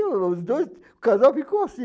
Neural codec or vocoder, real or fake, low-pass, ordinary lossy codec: none; real; none; none